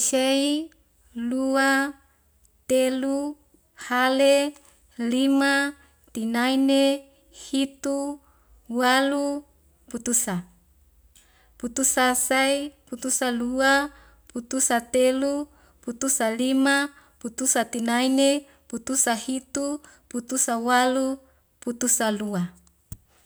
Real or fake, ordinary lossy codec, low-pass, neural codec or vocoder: real; none; none; none